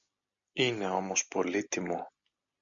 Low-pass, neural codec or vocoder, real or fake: 7.2 kHz; none; real